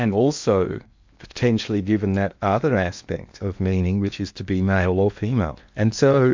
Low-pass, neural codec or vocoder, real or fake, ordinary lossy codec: 7.2 kHz; codec, 16 kHz in and 24 kHz out, 0.8 kbps, FocalCodec, streaming, 65536 codes; fake; MP3, 64 kbps